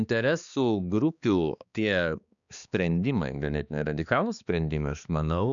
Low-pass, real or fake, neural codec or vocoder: 7.2 kHz; fake; codec, 16 kHz, 2 kbps, X-Codec, HuBERT features, trained on balanced general audio